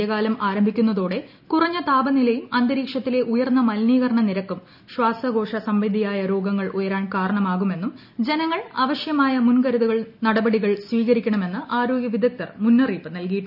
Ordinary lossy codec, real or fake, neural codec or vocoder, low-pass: none; real; none; 5.4 kHz